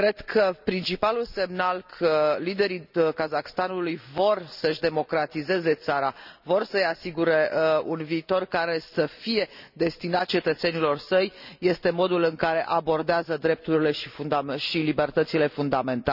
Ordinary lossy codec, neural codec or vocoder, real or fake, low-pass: none; none; real; 5.4 kHz